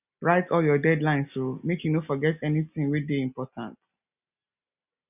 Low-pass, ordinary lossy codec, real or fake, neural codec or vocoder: 3.6 kHz; none; real; none